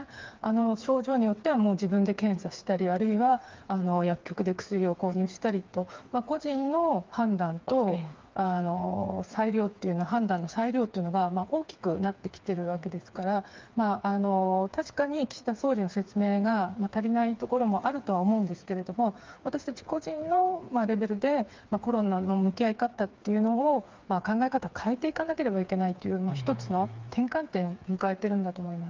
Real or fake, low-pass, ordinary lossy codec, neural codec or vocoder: fake; 7.2 kHz; Opus, 24 kbps; codec, 16 kHz, 4 kbps, FreqCodec, smaller model